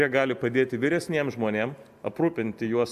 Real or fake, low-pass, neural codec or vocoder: real; 14.4 kHz; none